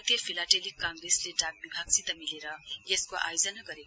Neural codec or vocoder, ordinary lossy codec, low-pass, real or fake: none; none; none; real